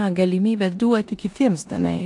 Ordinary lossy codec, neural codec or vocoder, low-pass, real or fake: AAC, 64 kbps; codec, 16 kHz in and 24 kHz out, 0.9 kbps, LongCat-Audio-Codec, four codebook decoder; 10.8 kHz; fake